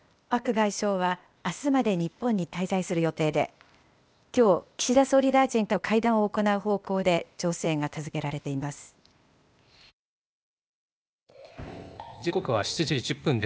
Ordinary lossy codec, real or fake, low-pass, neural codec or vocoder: none; fake; none; codec, 16 kHz, 0.8 kbps, ZipCodec